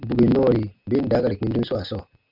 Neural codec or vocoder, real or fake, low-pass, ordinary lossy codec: none; real; 5.4 kHz; MP3, 48 kbps